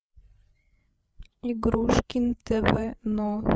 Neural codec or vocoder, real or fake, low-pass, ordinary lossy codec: codec, 16 kHz, 8 kbps, FreqCodec, larger model; fake; none; none